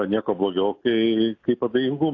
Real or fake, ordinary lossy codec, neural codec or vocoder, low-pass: real; AAC, 48 kbps; none; 7.2 kHz